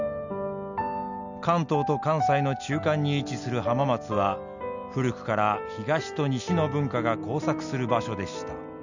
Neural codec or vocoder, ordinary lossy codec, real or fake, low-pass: none; none; real; 7.2 kHz